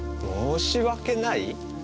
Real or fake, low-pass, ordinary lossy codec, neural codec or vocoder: real; none; none; none